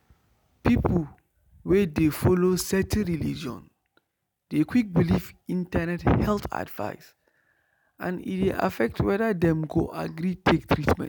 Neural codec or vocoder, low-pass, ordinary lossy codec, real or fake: none; none; none; real